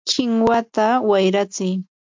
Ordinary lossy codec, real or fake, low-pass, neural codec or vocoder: MP3, 64 kbps; real; 7.2 kHz; none